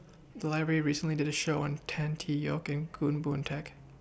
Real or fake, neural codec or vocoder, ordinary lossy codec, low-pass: real; none; none; none